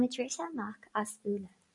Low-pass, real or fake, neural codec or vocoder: 10.8 kHz; fake; vocoder, 44.1 kHz, 128 mel bands every 512 samples, BigVGAN v2